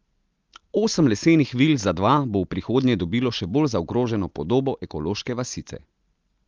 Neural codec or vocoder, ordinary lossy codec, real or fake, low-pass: none; Opus, 32 kbps; real; 7.2 kHz